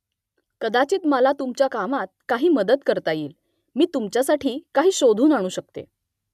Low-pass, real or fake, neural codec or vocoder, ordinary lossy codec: 14.4 kHz; real; none; none